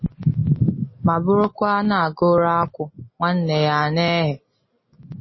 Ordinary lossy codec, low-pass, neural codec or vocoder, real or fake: MP3, 24 kbps; 7.2 kHz; none; real